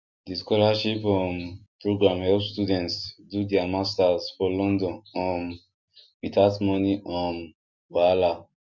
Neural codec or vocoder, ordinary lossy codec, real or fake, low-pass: none; MP3, 64 kbps; real; 7.2 kHz